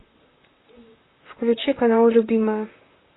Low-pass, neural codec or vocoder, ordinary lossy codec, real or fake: 7.2 kHz; vocoder, 44.1 kHz, 128 mel bands, Pupu-Vocoder; AAC, 16 kbps; fake